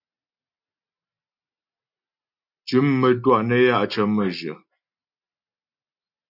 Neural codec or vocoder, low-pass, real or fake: none; 5.4 kHz; real